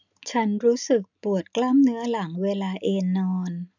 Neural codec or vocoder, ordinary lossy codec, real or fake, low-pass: none; none; real; 7.2 kHz